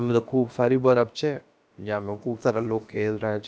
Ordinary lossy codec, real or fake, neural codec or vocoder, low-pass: none; fake; codec, 16 kHz, about 1 kbps, DyCAST, with the encoder's durations; none